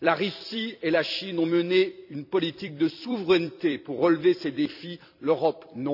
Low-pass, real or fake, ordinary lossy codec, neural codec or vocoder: 5.4 kHz; fake; none; vocoder, 44.1 kHz, 128 mel bands every 256 samples, BigVGAN v2